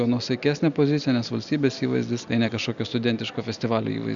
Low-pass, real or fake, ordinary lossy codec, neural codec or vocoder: 7.2 kHz; real; Opus, 64 kbps; none